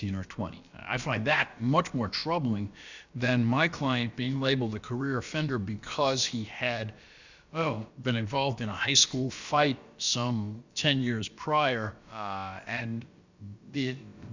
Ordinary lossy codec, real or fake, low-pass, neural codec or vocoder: Opus, 64 kbps; fake; 7.2 kHz; codec, 16 kHz, about 1 kbps, DyCAST, with the encoder's durations